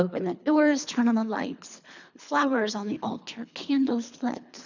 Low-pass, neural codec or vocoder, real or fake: 7.2 kHz; codec, 24 kHz, 3 kbps, HILCodec; fake